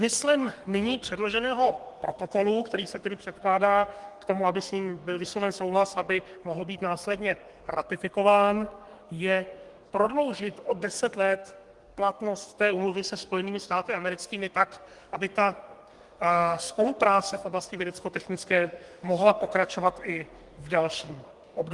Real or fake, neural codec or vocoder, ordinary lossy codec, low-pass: fake; codec, 32 kHz, 1.9 kbps, SNAC; Opus, 24 kbps; 10.8 kHz